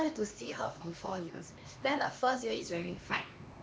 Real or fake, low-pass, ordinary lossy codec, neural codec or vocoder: fake; none; none; codec, 16 kHz, 2 kbps, X-Codec, HuBERT features, trained on LibriSpeech